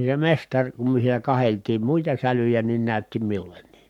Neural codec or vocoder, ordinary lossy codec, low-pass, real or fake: codec, 44.1 kHz, 7.8 kbps, Pupu-Codec; MP3, 96 kbps; 19.8 kHz; fake